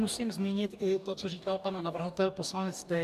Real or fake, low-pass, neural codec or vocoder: fake; 14.4 kHz; codec, 44.1 kHz, 2.6 kbps, DAC